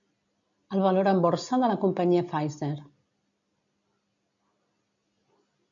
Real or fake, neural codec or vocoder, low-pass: real; none; 7.2 kHz